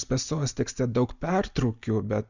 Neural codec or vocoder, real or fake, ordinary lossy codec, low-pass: none; real; Opus, 64 kbps; 7.2 kHz